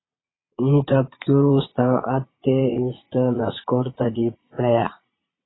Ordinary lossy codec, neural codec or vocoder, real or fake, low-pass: AAC, 16 kbps; vocoder, 22.05 kHz, 80 mel bands, Vocos; fake; 7.2 kHz